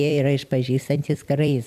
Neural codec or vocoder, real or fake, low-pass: vocoder, 44.1 kHz, 128 mel bands every 256 samples, BigVGAN v2; fake; 14.4 kHz